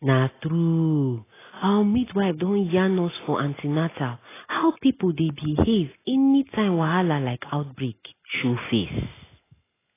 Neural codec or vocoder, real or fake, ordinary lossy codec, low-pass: none; real; AAC, 16 kbps; 3.6 kHz